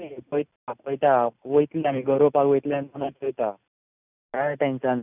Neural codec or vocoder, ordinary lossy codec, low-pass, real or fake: none; none; 3.6 kHz; real